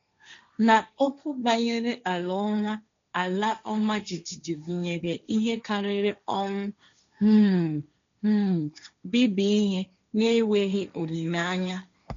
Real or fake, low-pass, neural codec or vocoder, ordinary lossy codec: fake; 7.2 kHz; codec, 16 kHz, 1.1 kbps, Voila-Tokenizer; MP3, 64 kbps